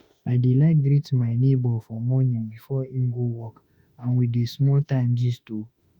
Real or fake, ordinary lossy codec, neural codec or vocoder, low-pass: fake; Opus, 64 kbps; autoencoder, 48 kHz, 32 numbers a frame, DAC-VAE, trained on Japanese speech; 19.8 kHz